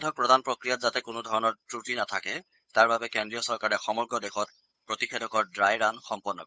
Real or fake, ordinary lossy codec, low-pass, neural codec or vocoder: fake; none; none; codec, 16 kHz, 8 kbps, FunCodec, trained on Chinese and English, 25 frames a second